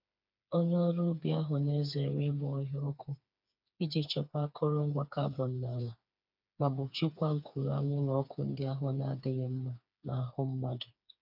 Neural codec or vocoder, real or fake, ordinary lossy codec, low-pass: codec, 16 kHz, 4 kbps, FreqCodec, smaller model; fake; none; 5.4 kHz